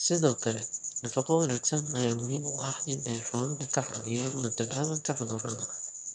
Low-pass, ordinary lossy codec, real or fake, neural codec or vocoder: 9.9 kHz; none; fake; autoencoder, 22.05 kHz, a latent of 192 numbers a frame, VITS, trained on one speaker